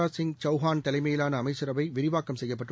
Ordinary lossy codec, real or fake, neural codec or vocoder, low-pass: none; real; none; none